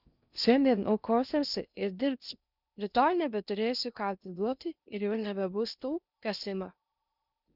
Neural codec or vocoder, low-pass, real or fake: codec, 16 kHz in and 24 kHz out, 0.6 kbps, FocalCodec, streaming, 2048 codes; 5.4 kHz; fake